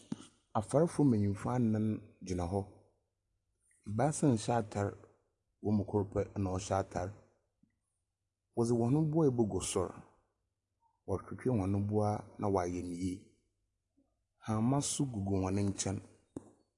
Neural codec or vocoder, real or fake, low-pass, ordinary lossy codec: none; real; 10.8 kHz; AAC, 64 kbps